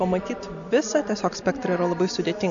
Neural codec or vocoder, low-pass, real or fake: none; 7.2 kHz; real